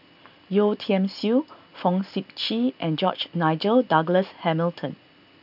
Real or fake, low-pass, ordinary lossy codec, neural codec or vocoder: real; 5.4 kHz; none; none